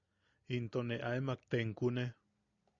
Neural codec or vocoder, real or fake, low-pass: none; real; 7.2 kHz